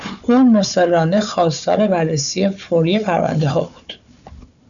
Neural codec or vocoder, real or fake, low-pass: codec, 16 kHz, 4 kbps, FunCodec, trained on Chinese and English, 50 frames a second; fake; 7.2 kHz